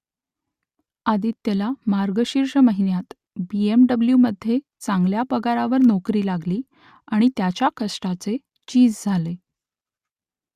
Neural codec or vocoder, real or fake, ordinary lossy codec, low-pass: none; real; Opus, 64 kbps; 14.4 kHz